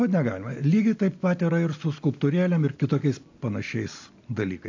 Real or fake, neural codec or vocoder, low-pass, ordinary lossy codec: real; none; 7.2 kHz; AAC, 48 kbps